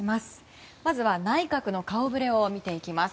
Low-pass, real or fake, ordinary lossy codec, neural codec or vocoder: none; real; none; none